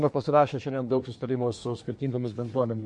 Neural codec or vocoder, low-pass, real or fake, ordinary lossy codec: codec, 24 kHz, 1 kbps, SNAC; 10.8 kHz; fake; MP3, 48 kbps